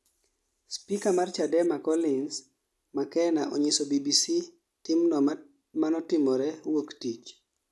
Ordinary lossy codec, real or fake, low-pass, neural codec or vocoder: none; real; none; none